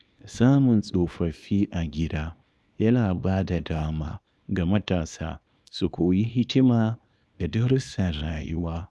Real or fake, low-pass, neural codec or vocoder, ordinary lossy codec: fake; none; codec, 24 kHz, 0.9 kbps, WavTokenizer, small release; none